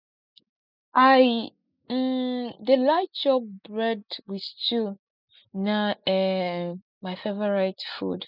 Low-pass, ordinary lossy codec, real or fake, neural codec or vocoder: 5.4 kHz; none; real; none